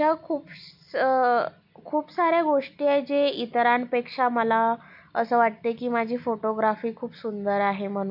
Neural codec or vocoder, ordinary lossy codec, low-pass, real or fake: none; none; 5.4 kHz; real